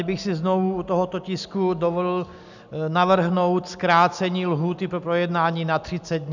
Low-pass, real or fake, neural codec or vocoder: 7.2 kHz; real; none